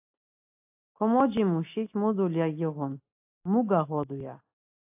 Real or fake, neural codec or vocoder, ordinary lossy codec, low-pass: real; none; AAC, 32 kbps; 3.6 kHz